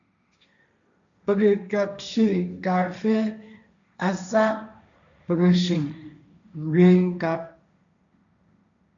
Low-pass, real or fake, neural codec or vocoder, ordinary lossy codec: 7.2 kHz; fake; codec, 16 kHz, 1.1 kbps, Voila-Tokenizer; MP3, 96 kbps